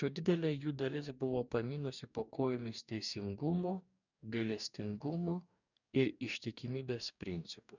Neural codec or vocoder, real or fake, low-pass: codec, 44.1 kHz, 2.6 kbps, DAC; fake; 7.2 kHz